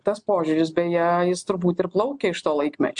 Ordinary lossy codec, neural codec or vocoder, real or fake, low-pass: MP3, 64 kbps; none; real; 9.9 kHz